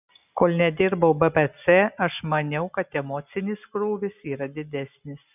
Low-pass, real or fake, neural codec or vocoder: 3.6 kHz; real; none